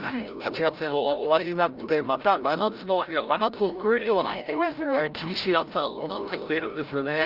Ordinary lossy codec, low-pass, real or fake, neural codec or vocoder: Opus, 24 kbps; 5.4 kHz; fake; codec, 16 kHz, 0.5 kbps, FreqCodec, larger model